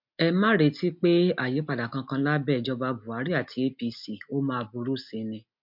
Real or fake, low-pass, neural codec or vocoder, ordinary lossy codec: real; 5.4 kHz; none; MP3, 48 kbps